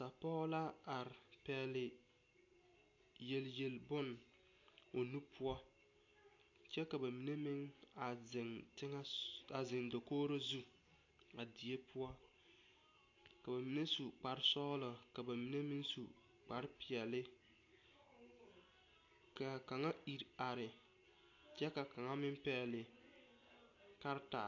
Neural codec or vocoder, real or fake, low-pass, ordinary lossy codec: none; real; 7.2 kHz; AAC, 48 kbps